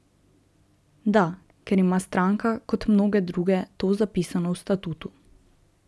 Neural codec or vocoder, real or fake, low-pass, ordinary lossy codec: none; real; none; none